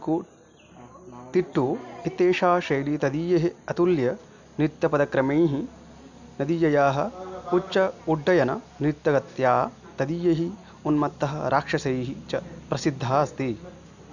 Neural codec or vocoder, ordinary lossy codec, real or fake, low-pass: none; none; real; 7.2 kHz